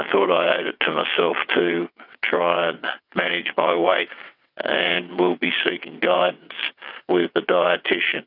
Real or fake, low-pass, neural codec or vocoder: fake; 5.4 kHz; vocoder, 22.05 kHz, 80 mel bands, WaveNeXt